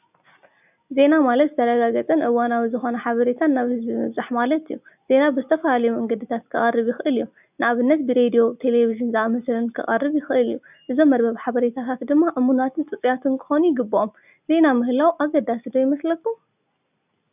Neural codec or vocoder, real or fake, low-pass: none; real; 3.6 kHz